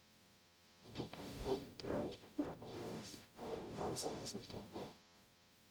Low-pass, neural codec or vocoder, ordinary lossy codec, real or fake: none; codec, 44.1 kHz, 0.9 kbps, DAC; none; fake